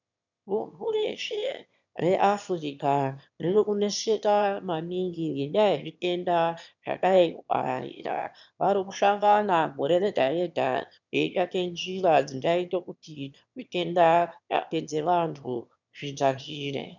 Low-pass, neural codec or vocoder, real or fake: 7.2 kHz; autoencoder, 22.05 kHz, a latent of 192 numbers a frame, VITS, trained on one speaker; fake